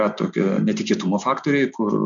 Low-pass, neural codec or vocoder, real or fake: 7.2 kHz; none; real